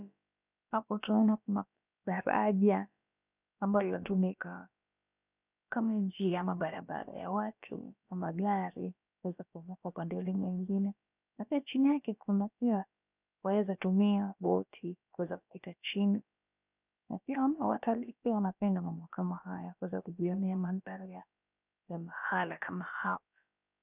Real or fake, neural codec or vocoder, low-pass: fake; codec, 16 kHz, about 1 kbps, DyCAST, with the encoder's durations; 3.6 kHz